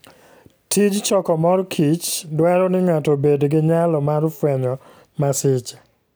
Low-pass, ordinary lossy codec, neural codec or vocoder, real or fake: none; none; none; real